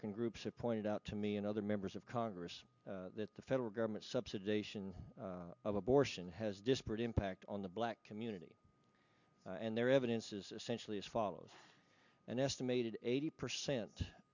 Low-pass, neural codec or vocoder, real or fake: 7.2 kHz; none; real